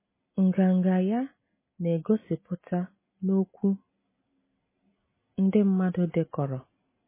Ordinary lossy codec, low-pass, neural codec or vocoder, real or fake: MP3, 16 kbps; 3.6 kHz; none; real